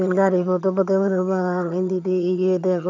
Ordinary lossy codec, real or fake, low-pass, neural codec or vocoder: none; fake; 7.2 kHz; vocoder, 22.05 kHz, 80 mel bands, HiFi-GAN